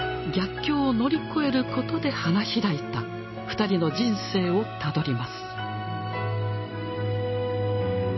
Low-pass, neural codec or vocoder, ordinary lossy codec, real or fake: 7.2 kHz; none; MP3, 24 kbps; real